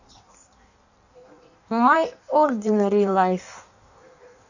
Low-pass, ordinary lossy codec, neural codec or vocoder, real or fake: 7.2 kHz; none; codec, 16 kHz in and 24 kHz out, 1.1 kbps, FireRedTTS-2 codec; fake